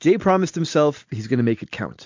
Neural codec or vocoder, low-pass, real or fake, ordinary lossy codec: none; 7.2 kHz; real; MP3, 48 kbps